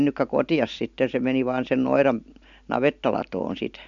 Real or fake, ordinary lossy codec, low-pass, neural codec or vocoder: real; MP3, 64 kbps; 7.2 kHz; none